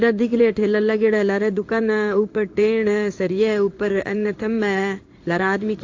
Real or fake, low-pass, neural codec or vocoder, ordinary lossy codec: fake; 7.2 kHz; codec, 16 kHz in and 24 kHz out, 1 kbps, XY-Tokenizer; AAC, 48 kbps